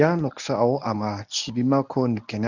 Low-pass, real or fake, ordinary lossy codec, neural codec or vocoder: 7.2 kHz; fake; none; codec, 24 kHz, 0.9 kbps, WavTokenizer, medium speech release version 1